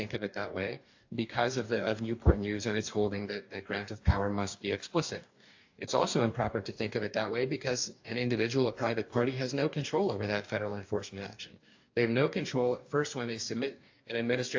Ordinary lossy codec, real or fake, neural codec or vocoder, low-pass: AAC, 48 kbps; fake; codec, 44.1 kHz, 2.6 kbps, DAC; 7.2 kHz